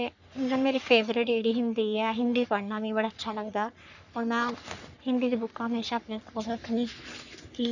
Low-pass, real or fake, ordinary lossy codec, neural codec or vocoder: 7.2 kHz; fake; none; codec, 44.1 kHz, 3.4 kbps, Pupu-Codec